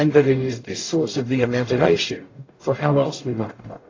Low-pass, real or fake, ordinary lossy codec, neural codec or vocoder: 7.2 kHz; fake; AAC, 32 kbps; codec, 44.1 kHz, 0.9 kbps, DAC